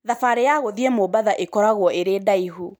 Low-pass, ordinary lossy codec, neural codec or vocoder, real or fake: none; none; none; real